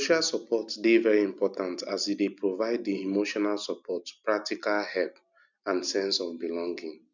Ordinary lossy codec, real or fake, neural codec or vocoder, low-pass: none; real; none; 7.2 kHz